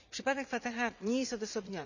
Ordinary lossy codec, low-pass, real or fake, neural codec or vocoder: none; 7.2 kHz; fake; vocoder, 44.1 kHz, 80 mel bands, Vocos